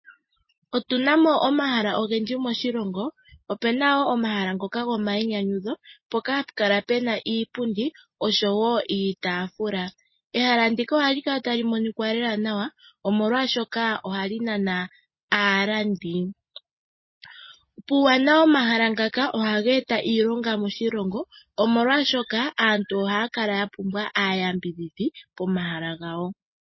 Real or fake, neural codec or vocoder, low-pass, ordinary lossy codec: real; none; 7.2 kHz; MP3, 24 kbps